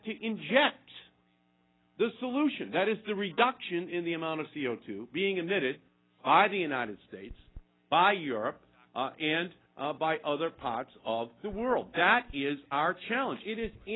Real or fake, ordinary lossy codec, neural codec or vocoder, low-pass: real; AAC, 16 kbps; none; 7.2 kHz